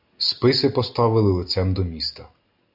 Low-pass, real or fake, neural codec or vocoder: 5.4 kHz; real; none